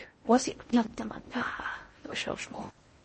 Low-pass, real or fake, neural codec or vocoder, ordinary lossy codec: 10.8 kHz; fake; codec, 16 kHz in and 24 kHz out, 0.6 kbps, FocalCodec, streaming, 4096 codes; MP3, 32 kbps